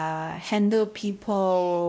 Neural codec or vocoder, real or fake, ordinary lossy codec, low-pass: codec, 16 kHz, 0.5 kbps, X-Codec, WavLM features, trained on Multilingual LibriSpeech; fake; none; none